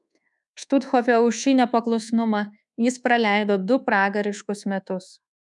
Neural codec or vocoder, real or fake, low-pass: codec, 24 kHz, 1.2 kbps, DualCodec; fake; 10.8 kHz